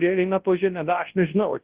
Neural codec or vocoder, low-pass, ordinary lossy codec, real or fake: codec, 24 kHz, 0.9 kbps, WavTokenizer, large speech release; 3.6 kHz; Opus, 16 kbps; fake